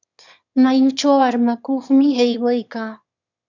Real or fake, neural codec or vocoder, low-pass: fake; autoencoder, 22.05 kHz, a latent of 192 numbers a frame, VITS, trained on one speaker; 7.2 kHz